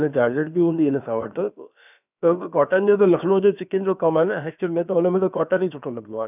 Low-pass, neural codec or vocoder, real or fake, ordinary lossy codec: 3.6 kHz; codec, 16 kHz, about 1 kbps, DyCAST, with the encoder's durations; fake; none